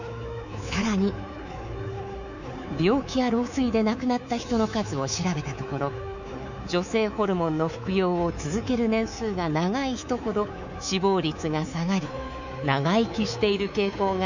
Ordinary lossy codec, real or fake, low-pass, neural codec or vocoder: none; fake; 7.2 kHz; codec, 24 kHz, 3.1 kbps, DualCodec